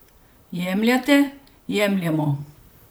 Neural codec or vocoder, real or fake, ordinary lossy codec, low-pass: vocoder, 44.1 kHz, 128 mel bands, Pupu-Vocoder; fake; none; none